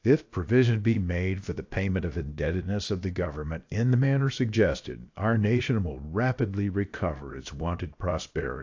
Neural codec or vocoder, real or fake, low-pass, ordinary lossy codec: codec, 16 kHz, about 1 kbps, DyCAST, with the encoder's durations; fake; 7.2 kHz; AAC, 48 kbps